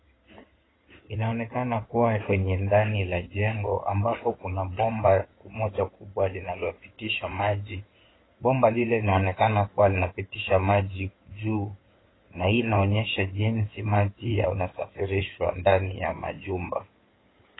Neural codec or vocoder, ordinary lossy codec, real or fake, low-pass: codec, 16 kHz in and 24 kHz out, 2.2 kbps, FireRedTTS-2 codec; AAC, 16 kbps; fake; 7.2 kHz